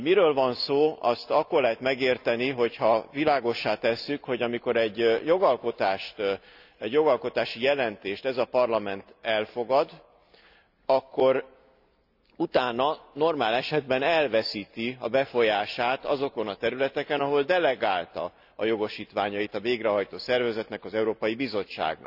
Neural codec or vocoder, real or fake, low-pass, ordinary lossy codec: none; real; 5.4 kHz; none